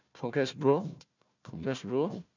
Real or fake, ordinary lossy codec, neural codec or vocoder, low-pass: fake; none; codec, 16 kHz, 1 kbps, FunCodec, trained on Chinese and English, 50 frames a second; 7.2 kHz